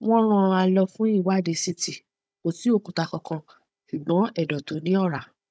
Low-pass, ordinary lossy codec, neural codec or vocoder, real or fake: none; none; codec, 16 kHz, 16 kbps, FunCodec, trained on Chinese and English, 50 frames a second; fake